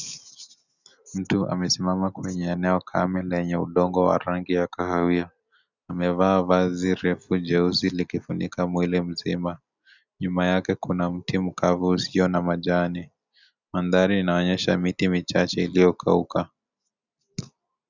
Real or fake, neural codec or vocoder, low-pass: real; none; 7.2 kHz